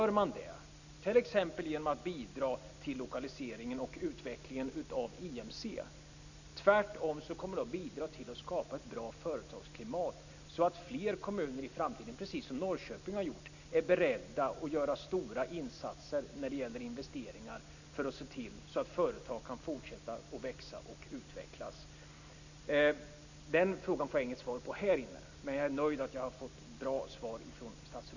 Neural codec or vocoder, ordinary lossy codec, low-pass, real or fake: none; none; 7.2 kHz; real